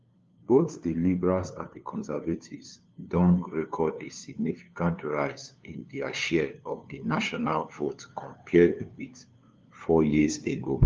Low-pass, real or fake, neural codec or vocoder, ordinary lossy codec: 7.2 kHz; fake; codec, 16 kHz, 2 kbps, FunCodec, trained on LibriTTS, 25 frames a second; Opus, 32 kbps